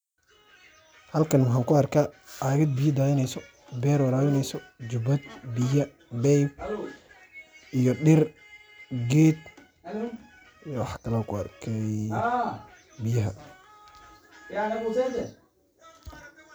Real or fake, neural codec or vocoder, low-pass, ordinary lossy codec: real; none; none; none